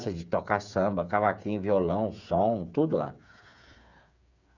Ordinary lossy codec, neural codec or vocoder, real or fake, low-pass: none; codec, 16 kHz, 8 kbps, FreqCodec, smaller model; fake; 7.2 kHz